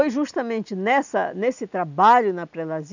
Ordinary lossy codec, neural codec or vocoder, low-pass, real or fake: none; none; 7.2 kHz; real